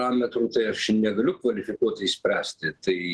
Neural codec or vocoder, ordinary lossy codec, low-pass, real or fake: none; Opus, 16 kbps; 10.8 kHz; real